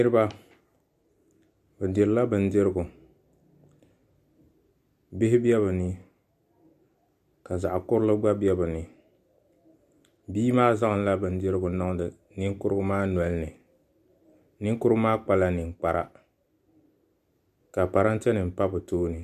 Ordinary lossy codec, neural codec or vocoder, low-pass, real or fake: MP3, 96 kbps; none; 14.4 kHz; real